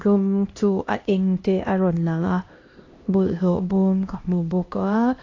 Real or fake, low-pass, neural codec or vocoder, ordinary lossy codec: fake; 7.2 kHz; codec, 16 kHz, 1 kbps, X-Codec, HuBERT features, trained on LibriSpeech; AAC, 32 kbps